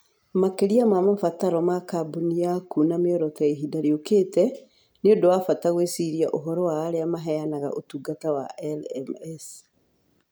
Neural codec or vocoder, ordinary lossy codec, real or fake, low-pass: none; none; real; none